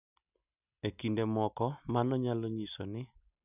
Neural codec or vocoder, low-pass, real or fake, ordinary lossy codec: none; 3.6 kHz; real; none